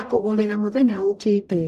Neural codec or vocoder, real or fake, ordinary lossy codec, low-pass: codec, 44.1 kHz, 0.9 kbps, DAC; fake; none; 14.4 kHz